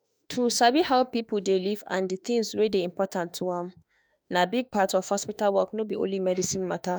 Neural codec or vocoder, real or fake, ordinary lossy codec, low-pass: autoencoder, 48 kHz, 32 numbers a frame, DAC-VAE, trained on Japanese speech; fake; none; none